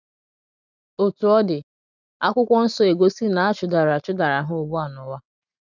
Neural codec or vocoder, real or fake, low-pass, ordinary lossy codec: none; real; 7.2 kHz; none